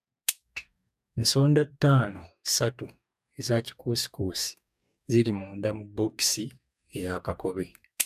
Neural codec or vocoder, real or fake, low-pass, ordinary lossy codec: codec, 44.1 kHz, 2.6 kbps, DAC; fake; 14.4 kHz; none